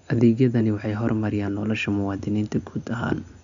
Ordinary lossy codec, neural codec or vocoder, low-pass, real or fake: none; none; 7.2 kHz; real